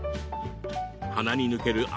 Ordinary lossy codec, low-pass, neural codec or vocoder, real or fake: none; none; none; real